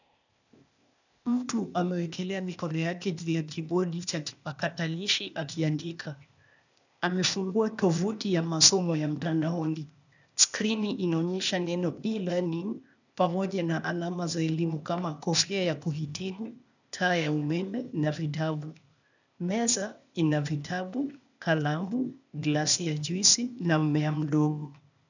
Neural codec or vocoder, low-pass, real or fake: codec, 16 kHz, 0.8 kbps, ZipCodec; 7.2 kHz; fake